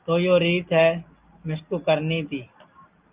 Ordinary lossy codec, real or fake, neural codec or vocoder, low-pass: Opus, 24 kbps; real; none; 3.6 kHz